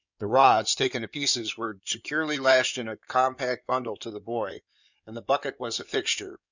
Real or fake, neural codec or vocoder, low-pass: fake; codec, 16 kHz in and 24 kHz out, 2.2 kbps, FireRedTTS-2 codec; 7.2 kHz